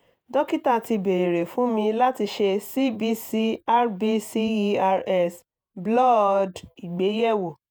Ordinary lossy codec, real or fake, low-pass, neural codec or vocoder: none; fake; none; vocoder, 48 kHz, 128 mel bands, Vocos